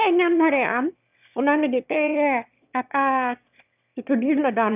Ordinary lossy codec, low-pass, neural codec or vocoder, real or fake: none; 3.6 kHz; autoencoder, 22.05 kHz, a latent of 192 numbers a frame, VITS, trained on one speaker; fake